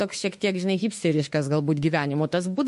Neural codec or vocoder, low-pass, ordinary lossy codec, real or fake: autoencoder, 48 kHz, 32 numbers a frame, DAC-VAE, trained on Japanese speech; 14.4 kHz; MP3, 48 kbps; fake